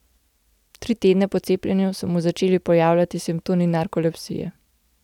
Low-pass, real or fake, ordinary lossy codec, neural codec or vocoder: 19.8 kHz; real; none; none